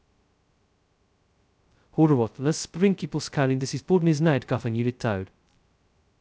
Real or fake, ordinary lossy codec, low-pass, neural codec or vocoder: fake; none; none; codec, 16 kHz, 0.2 kbps, FocalCodec